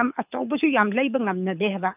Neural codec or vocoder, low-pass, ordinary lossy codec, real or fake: codec, 24 kHz, 6 kbps, HILCodec; 3.6 kHz; none; fake